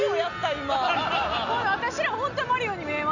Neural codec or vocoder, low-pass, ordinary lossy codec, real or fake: none; 7.2 kHz; none; real